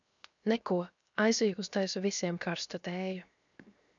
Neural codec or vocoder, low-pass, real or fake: codec, 16 kHz, 0.7 kbps, FocalCodec; 7.2 kHz; fake